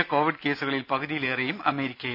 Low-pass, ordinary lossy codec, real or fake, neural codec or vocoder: 5.4 kHz; none; real; none